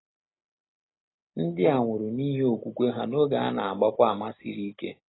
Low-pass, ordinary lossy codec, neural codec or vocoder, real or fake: 7.2 kHz; AAC, 16 kbps; none; real